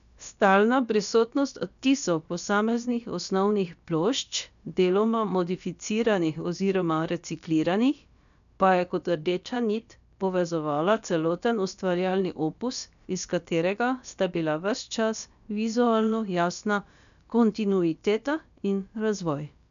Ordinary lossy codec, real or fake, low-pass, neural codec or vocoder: none; fake; 7.2 kHz; codec, 16 kHz, about 1 kbps, DyCAST, with the encoder's durations